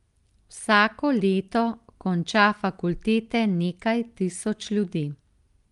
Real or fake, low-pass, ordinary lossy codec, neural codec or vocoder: real; 10.8 kHz; Opus, 32 kbps; none